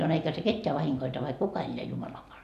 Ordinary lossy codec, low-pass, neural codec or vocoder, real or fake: none; 14.4 kHz; none; real